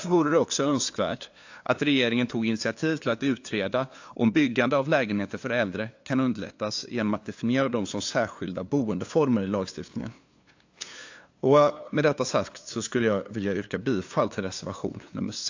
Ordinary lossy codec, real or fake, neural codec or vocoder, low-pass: AAC, 48 kbps; fake; codec, 16 kHz, 2 kbps, FunCodec, trained on LibriTTS, 25 frames a second; 7.2 kHz